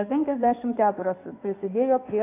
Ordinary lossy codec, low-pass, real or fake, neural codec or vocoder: AAC, 24 kbps; 3.6 kHz; fake; codec, 16 kHz in and 24 kHz out, 2.2 kbps, FireRedTTS-2 codec